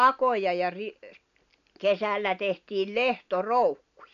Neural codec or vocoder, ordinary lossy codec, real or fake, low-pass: none; none; real; 7.2 kHz